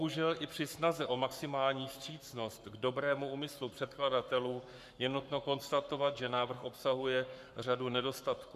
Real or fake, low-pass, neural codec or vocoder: fake; 14.4 kHz; codec, 44.1 kHz, 7.8 kbps, Pupu-Codec